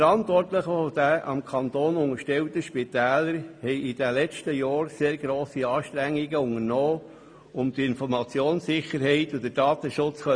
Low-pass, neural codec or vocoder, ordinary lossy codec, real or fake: 9.9 kHz; none; MP3, 64 kbps; real